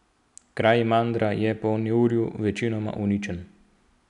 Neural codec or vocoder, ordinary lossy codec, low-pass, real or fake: none; none; 10.8 kHz; real